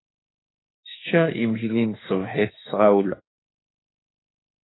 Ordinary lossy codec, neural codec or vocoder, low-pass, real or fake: AAC, 16 kbps; autoencoder, 48 kHz, 32 numbers a frame, DAC-VAE, trained on Japanese speech; 7.2 kHz; fake